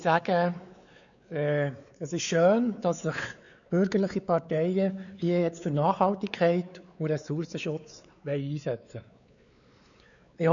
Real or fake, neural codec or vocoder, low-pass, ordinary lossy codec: fake; codec, 16 kHz, 4 kbps, X-Codec, WavLM features, trained on Multilingual LibriSpeech; 7.2 kHz; none